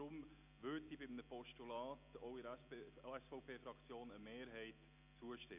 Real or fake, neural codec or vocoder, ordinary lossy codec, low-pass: real; none; none; 3.6 kHz